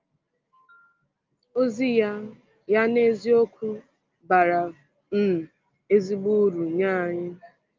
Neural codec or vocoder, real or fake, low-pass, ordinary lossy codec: none; real; 7.2 kHz; Opus, 24 kbps